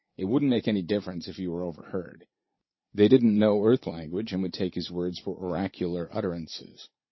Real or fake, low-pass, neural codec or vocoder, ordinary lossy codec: fake; 7.2 kHz; vocoder, 44.1 kHz, 80 mel bands, Vocos; MP3, 24 kbps